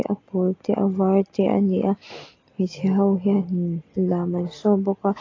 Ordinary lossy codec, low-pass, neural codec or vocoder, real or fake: AAC, 32 kbps; 7.2 kHz; none; real